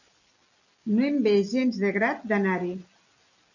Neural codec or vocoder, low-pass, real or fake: none; 7.2 kHz; real